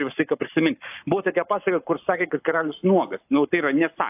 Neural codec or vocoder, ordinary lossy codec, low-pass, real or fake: codec, 44.1 kHz, 7.8 kbps, Pupu-Codec; AAC, 32 kbps; 3.6 kHz; fake